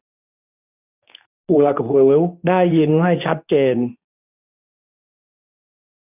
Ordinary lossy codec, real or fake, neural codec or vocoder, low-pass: none; fake; codec, 24 kHz, 0.9 kbps, WavTokenizer, medium speech release version 2; 3.6 kHz